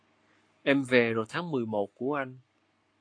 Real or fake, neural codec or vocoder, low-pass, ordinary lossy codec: fake; codec, 44.1 kHz, 7.8 kbps, Pupu-Codec; 9.9 kHz; AAC, 48 kbps